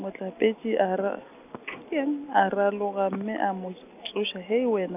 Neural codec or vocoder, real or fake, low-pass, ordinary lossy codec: none; real; 3.6 kHz; none